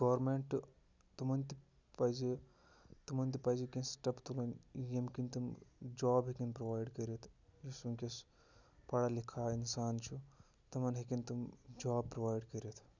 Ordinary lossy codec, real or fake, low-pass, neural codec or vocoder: none; real; 7.2 kHz; none